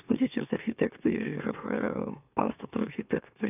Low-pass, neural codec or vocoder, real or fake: 3.6 kHz; autoencoder, 44.1 kHz, a latent of 192 numbers a frame, MeloTTS; fake